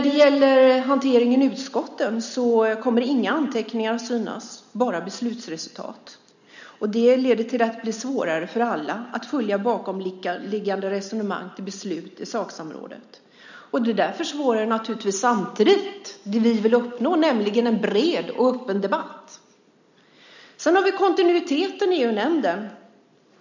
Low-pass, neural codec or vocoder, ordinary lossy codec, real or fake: 7.2 kHz; none; none; real